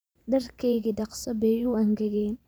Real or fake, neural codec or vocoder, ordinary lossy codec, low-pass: fake; vocoder, 44.1 kHz, 128 mel bands every 512 samples, BigVGAN v2; none; none